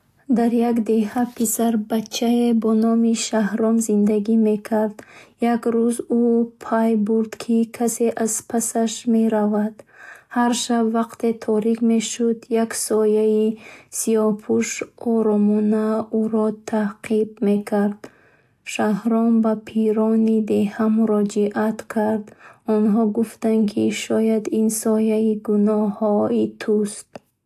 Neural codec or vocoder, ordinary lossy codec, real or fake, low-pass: none; AAC, 64 kbps; real; 14.4 kHz